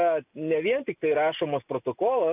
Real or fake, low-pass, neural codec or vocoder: real; 3.6 kHz; none